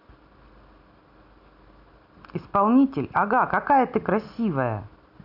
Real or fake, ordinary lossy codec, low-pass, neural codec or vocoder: real; none; 5.4 kHz; none